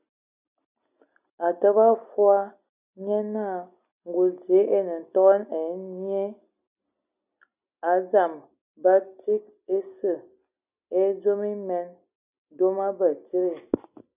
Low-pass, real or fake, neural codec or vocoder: 3.6 kHz; real; none